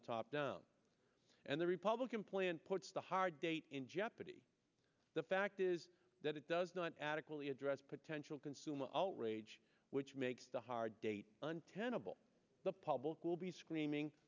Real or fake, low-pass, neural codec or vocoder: real; 7.2 kHz; none